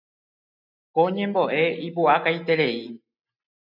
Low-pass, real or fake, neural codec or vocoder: 5.4 kHz; real; none